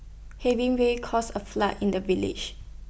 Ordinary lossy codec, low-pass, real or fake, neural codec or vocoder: none; none; real; none